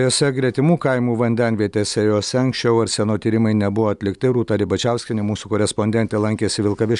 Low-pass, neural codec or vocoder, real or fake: 10.8 kHz; none; real